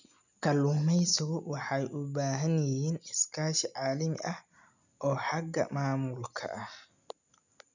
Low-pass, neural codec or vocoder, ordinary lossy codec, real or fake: 7.2 kHz; none; none; real